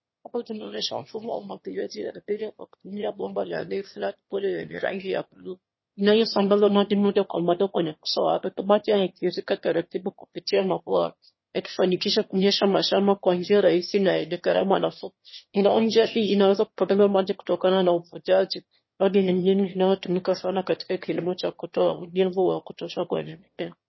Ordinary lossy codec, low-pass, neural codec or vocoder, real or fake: MP3, 24 kbps; 7.2 kHz; autoencoder, 22.05 kHz, a latent of 192 numbers a frame, VITS, trained on one speaker; fake